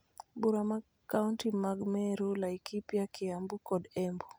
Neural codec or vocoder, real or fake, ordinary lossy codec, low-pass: none; real; none; none